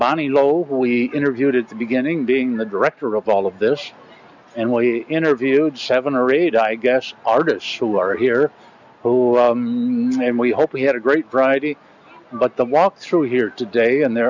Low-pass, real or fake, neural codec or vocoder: 7.2 kHz; real; none